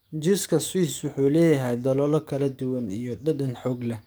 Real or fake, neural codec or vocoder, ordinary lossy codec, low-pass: fake; codec, 44.1 kHz, 7.8 kbps, DAC; none; none